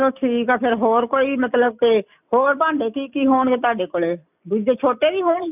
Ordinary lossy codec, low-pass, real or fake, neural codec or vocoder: none; 3.6 kHz; real; none